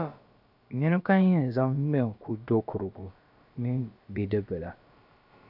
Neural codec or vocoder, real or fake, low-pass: codec, 16 kHz, about 1 kbps, DyCAST, with the encoder's durations; fake; 5.4 kHz